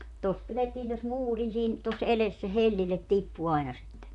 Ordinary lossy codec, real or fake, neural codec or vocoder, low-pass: none; fake; vocoder, 44.1 kHz, 128 mel bands, Pupu-Vocoder; 10.8 kHz